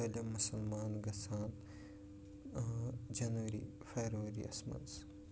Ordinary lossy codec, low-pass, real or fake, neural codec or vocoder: none; none; real; none